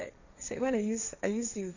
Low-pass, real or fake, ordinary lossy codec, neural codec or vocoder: 7.2 kHz; fake; none; codec, 16 kHz, 1.1 kbps, Voila-Tokenizer